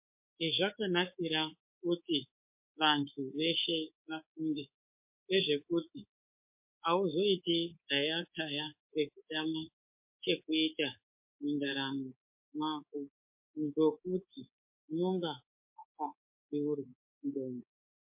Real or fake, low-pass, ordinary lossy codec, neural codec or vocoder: fake; 3.6 kHz; MP3, 32 kbps; codec, 24 kHz, 3.1 kbps, DualCodec